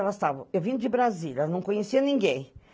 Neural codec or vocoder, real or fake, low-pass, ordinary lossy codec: none; real; none; none